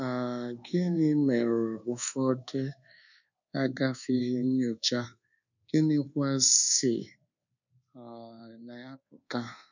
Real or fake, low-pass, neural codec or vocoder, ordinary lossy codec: fake; 7.2 kHz; codec, 24 kHz, 1.2 kbps, DualCodec; none